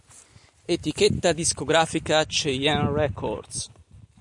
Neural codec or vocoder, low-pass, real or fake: none; 10.8 kHz; real